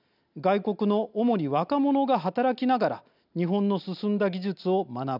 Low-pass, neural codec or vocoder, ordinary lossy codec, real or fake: 5.4 kHz; none; none; real